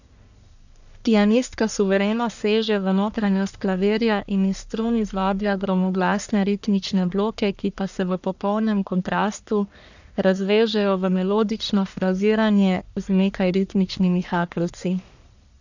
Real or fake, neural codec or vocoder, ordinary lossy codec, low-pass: fake; codec, 44.1 kHz, 1.7 kbps, Pupu-Codec; none; 7.2 kHz